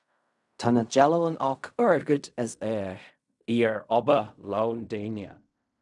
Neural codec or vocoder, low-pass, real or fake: codec, 16 kHz in and 24 kHz out, 0.4 kbps, LongCat-Audio-Codec, fine tuned four codebook decoder; 10.8 kHz; fake